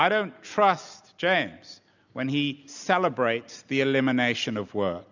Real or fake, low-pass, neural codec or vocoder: real; 7.2 kHz; none